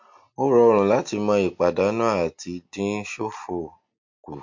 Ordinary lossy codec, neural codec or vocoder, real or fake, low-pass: MP3, 48 kbps; none; real; 7.2 kHz